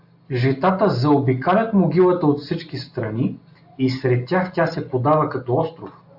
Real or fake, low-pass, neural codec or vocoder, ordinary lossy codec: real; 5.4 kHz; none; AAC, 48 kbps